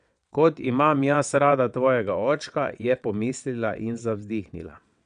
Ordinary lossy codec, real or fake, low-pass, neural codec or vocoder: none; fake; 9.9 kHz; vocoder, 22.05 kHz, 80 mel bands, WaveNeXt